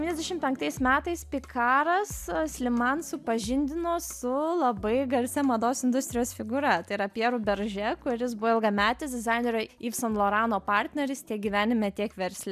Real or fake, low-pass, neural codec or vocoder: real; 14.4 kHz; none